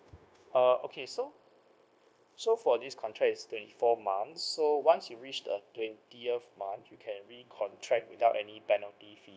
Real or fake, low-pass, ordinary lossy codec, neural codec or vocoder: fake; none; none; codec, 16 kHz, 0.9 kbps, LongCat-Audio-Codec